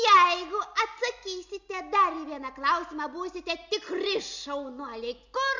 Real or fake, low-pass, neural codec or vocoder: real; 7.2 kHz; none